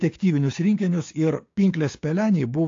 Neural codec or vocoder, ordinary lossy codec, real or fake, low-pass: codec, 16 kHz, 6 kbps, DAC; AAC, 48 kbps; fake; 7.2 kHz